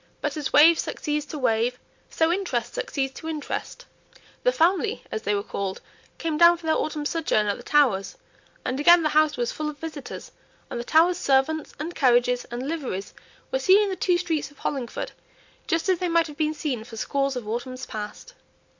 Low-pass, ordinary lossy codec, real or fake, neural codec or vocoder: 7.2 kHz; MP3, 48 kbps; real; none